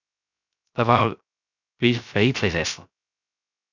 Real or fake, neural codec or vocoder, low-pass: fake; codec, 16 kHz, 0.3 kbps, FocalCodec; 7.2 kHz